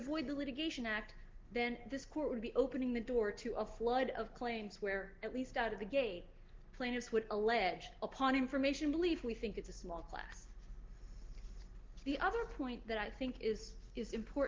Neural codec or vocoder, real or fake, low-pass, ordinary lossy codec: none; real; 7.2 kHz; Opus, 16 kbps